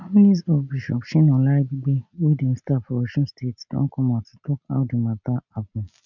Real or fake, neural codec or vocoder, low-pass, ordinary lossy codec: real; none; 7.2 kHz; none